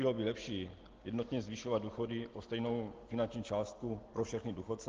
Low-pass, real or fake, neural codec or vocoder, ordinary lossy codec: 7.2 kHz; real; none; Opus, 16 kbps